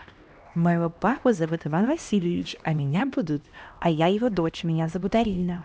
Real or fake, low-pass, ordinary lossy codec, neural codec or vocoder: fake; none; none; codec, 16 kHz, 1 kbps, X-Codec, HuBERT features, trained on LibriSpeech